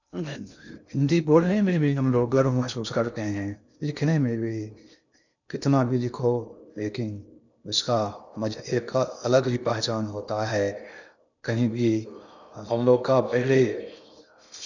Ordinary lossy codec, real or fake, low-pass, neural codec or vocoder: none; fake; 7.2 kHz; codec, 16 kHz in and 24 kHz out, 0.6 kbps, FocalCodec, streaming, 2048 codes